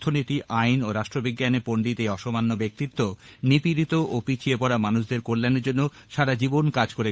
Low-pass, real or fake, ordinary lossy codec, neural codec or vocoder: none; fake; none; codec, 16 kHz, 8 kbps, FunCodec, trained on Chinese and English, 25 frames a second